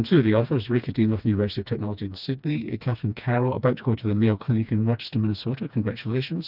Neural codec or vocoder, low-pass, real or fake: codec, 16 kHz, 2 kbps, FreqCodec, smaller model; 5.4 kHz; fake